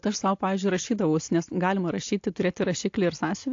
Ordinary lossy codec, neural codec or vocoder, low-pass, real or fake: AAC, 48 kbps; none; 7.2 kHz; real